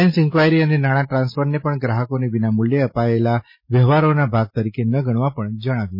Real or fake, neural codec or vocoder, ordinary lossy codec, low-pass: real; none; MP3, 24 kbps; 5.4 kHz